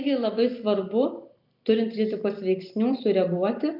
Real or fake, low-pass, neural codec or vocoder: real; 5.4 kHz; none